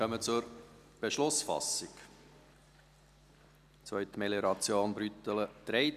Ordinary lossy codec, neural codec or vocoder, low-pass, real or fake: none; none; 14.4 kHz; real